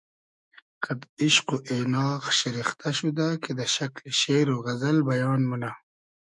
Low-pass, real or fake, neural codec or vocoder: 10.8 kHz; fake; autoencoder, 48 kHz, 128 numbers a frame, DAC-VAE, trained on Japanese speech